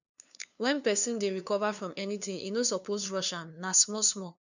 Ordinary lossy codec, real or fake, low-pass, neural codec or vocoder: none; fake; 7.2 kHz; codec, 16 kHz, 2 kbps, FunCodec, trained on LibriTTS, 25 frames a second